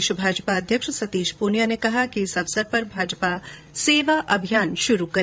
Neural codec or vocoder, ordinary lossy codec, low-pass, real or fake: codec, 16 kHz, 16 kbps, FreqCodec, larger model; none; none; fake